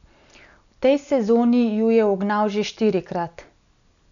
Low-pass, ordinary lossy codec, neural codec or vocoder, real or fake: 7.2 kHz; none; none; real